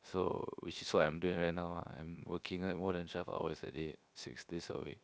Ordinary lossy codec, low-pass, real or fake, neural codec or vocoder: none; none; fake; codec, 16 kHz, 0.7 kbps, FocalCodec